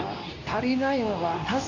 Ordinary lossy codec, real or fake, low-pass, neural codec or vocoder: none; fake; 7.2 kHz; codec, 24 kHz, 0.9 kbps, WavTokenizer, medium speech release version 2